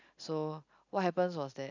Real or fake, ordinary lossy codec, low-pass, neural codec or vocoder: real; none; 7.2 kHz; none